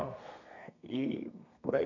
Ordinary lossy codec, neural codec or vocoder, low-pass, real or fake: none; codec, 32 kHz, 1.9 kbps, SNAC; 7.2 kHz; fake